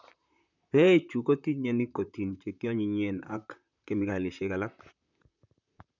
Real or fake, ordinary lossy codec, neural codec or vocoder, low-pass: fake; none; vocoder, 44.1 kHz, 128 mel bands, Pupu-Vocoder; 7.2 kHz